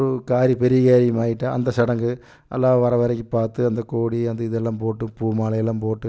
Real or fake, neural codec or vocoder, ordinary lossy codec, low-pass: real; none; none; none